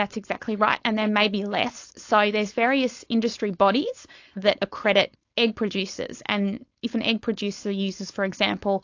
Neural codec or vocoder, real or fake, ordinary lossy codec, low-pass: codec, 16 kHz, 4.8 kbps, FACodec; fake; AAC, 48 kbps; 7.2 kHz